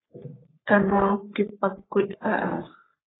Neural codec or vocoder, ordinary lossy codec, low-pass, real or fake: codec, 44.1 kHz, 3.4 kbps, Pupu-Codec; AAC, 16 kbps; 7.2 kHz; fake